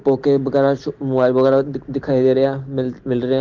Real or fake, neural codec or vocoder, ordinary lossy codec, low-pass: real; none; Opus, 16 kbps; 7.2 kHz